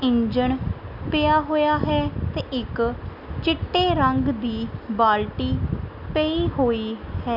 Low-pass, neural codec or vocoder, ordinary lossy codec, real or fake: 5.4 kHz; none; none; real